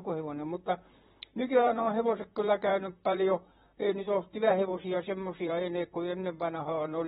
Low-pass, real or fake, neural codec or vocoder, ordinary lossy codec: 19.8 kHz; fake; vocoder, 44.1 kHz, 128 mel bands, Pupu-Vocoder; AAC, 16 kbps